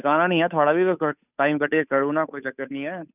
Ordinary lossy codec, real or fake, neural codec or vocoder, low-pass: none; fake; codec, 16 kHz, 8 kbps, FunCodec, trained on Chinese and English, 25 frames a second; 3.6 kHz